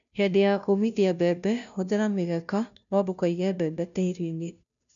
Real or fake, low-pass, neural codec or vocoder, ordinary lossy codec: fake; 7.2 kHz; codec, 16 kHz, 0.5 kbps, FunCodec, trained on Chinese and English, 25 frames a second; none